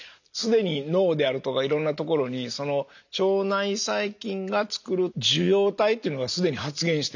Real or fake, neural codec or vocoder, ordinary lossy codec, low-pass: real; none; none; 7.2 kHz